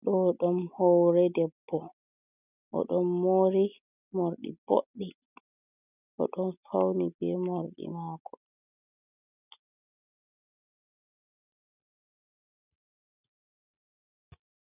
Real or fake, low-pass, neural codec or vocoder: real; 3.6 kHz; none